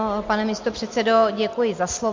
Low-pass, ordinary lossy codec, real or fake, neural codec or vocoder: 7.2 kHz; MP3, 48 kbps; real; none